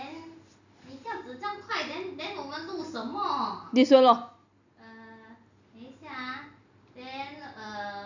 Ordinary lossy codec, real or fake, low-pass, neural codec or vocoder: none; real; 7.2 kHz; none